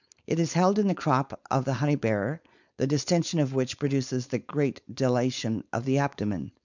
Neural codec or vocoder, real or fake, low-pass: codec, 16 kHz, 4.8 kbps, FACodec; fake; 7.2 kHz